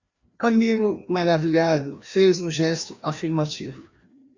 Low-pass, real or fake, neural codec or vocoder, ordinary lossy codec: 7.2 kHz; fake; codec, 16 kHz, 1 kbps, FreqCodec, larger model; Opus, 64 kbps